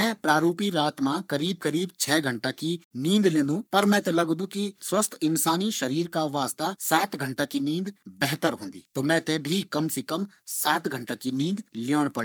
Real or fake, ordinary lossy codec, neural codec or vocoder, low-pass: fake; none; codec, 44.1 kHz, 3.4 kbps, Pupu-Codec; none